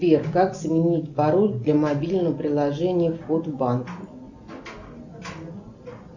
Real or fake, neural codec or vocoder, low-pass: real; none; 7.2 kHz